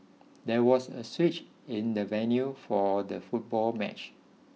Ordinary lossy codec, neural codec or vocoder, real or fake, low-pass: none; none; real; none